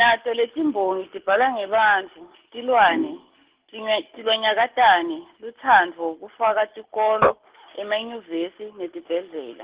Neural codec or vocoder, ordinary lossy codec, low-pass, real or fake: none; Opus, 16 kbps; 3.6 kHz; real